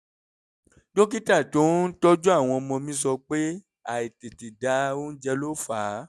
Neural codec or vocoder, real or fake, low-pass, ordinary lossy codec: none; real; none; none